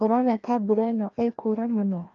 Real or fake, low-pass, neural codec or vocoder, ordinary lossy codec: fake; 7.2 kHz; codec, 16 kHz, 1 kbps, FreqCodec, larger model; Opus, 24 kbps